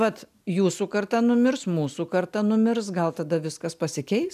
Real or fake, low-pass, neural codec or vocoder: real; 14.4 kHz; none